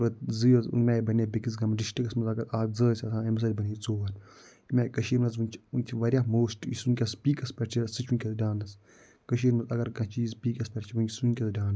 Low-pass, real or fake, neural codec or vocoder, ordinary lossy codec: none; real; none; none